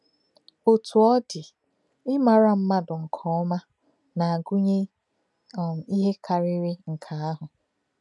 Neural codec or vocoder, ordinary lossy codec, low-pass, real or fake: none; none; 10.8 kHz; real